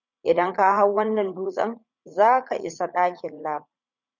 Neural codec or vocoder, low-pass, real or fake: vocoder, 44.1 kHz, 80 mel bands, Vocos; 7.2 kHz; fake